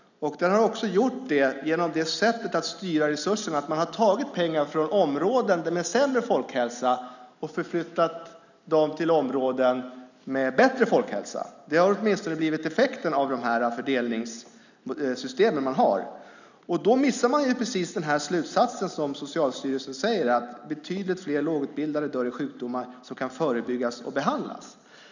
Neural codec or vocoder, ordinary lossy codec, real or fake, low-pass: none; none; real; 7.2 kHz